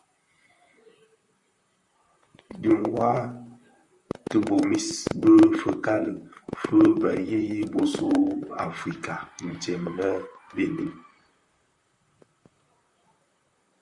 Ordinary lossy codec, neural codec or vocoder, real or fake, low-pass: Opus, 64 kbps; vocoder, 44.1 kHz, 128 mel bands, Pupu-Vocoder; fake; 10.8 kHz